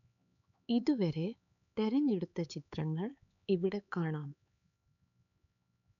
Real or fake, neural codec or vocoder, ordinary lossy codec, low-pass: fake; codec, 16 kHz, 4 kbps, X-Codec, HuBERT features, trained on LibriSpeech; none; 7.2 kHz